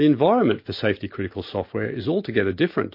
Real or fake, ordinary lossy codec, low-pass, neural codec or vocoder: real; MP3, 32 kbps; 5.4 kHz; none